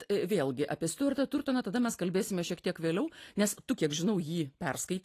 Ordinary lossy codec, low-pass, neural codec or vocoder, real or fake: AAC, 48 kbps; 14.4 kHz; none; real